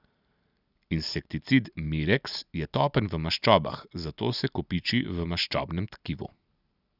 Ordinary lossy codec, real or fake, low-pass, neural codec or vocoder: none; real; 5.4 kHz; none